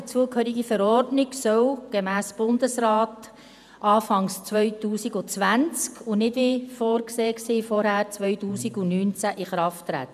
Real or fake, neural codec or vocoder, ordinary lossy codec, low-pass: fake; vocoder, 48 kHz, 128 mel bands, Vocos; none; 14.4 kHz